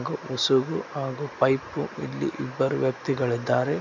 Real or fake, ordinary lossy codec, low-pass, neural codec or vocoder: real; none; 7.2 kHz; none